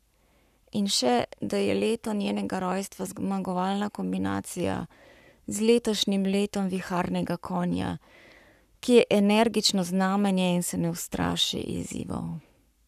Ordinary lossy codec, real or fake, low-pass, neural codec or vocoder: none; fake; 14.4 kHz; codec, 44.1 kHz, 7.8 kbps, Pupu-Codec